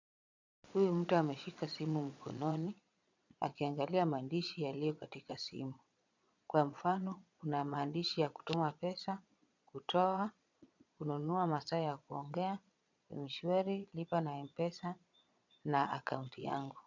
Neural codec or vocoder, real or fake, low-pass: vocoder, 22.05 kHz, 80 mel bands, Vocos; fake; 7.2 kHz